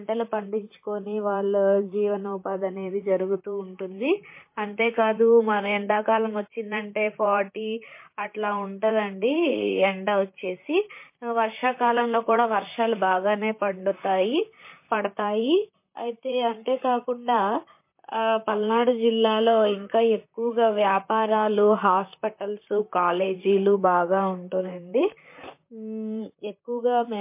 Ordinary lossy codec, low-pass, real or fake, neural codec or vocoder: MP3, 24 kbps; 3.6 kHz; fake; vocoder, 44.1 kHz, 128 mel bands, Pupu-Vocoder